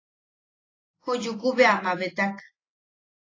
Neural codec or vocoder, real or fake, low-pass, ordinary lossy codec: none; real; 7.2 kHz; AAC, 32 kbps